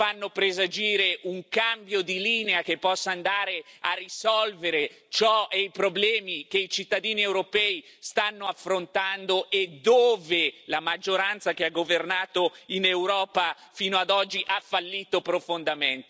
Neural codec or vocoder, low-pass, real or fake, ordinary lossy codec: none; none; real; none